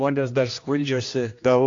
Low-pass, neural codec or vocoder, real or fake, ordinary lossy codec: 7.2 kHz; codec, 16 kHz, 1 kbps, X-Codec, HuBERT features, trained on general audio; fake; AAC, 48 kbps